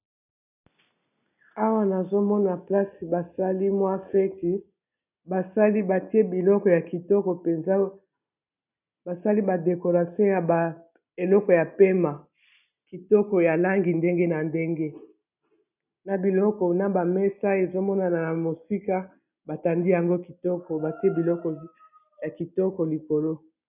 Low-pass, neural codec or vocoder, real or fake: 3.6 kHz; none; real